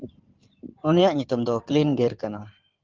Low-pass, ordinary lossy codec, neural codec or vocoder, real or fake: 7.2 kHz; Opus, 32 kbps; codec, 16 kHz in and 24 kHz out, 2.2 kbps, FireRedTTS-2 codec; fake